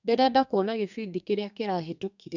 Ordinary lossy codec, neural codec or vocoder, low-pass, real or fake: none; codec, 32 kHz, 1.9 kbps, SNAC; 7.2 kHz; fake